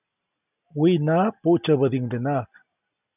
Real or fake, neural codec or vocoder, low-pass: real; none; 3.6 kHz